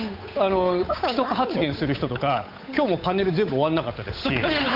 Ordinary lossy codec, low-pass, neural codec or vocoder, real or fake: AAC, 48 kbps; 5.4 kHz; codec, 16 kHz, 8 kbps, FunCodec, trained on Chinese and English, 25 frames a second; fake